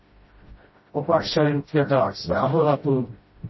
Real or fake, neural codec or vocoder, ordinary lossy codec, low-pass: fake; codec, 16 kHz, 0.5 kbps, FreqCodec, smaller model; MP3, 24 kbps; 7.2 kHz